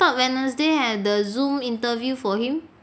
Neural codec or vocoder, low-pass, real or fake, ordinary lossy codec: none; none; real; none